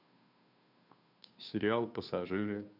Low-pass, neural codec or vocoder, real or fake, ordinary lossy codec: 5.4 kHz; codec, 16 kHz, 2 kbps, FunCodec, trained on Chinese and English, 25 frames a second; fake; none